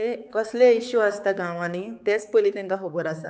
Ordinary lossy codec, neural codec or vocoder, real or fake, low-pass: none; codec, 16 kHz, 4 kbps, X-Codec, HuBERT features, trained on balanced general audio; fake; none